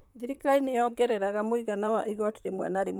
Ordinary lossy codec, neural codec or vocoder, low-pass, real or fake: none; vocoder, 44.1 kHz, 128 mel bands, Pupu-Vocoder; none; fake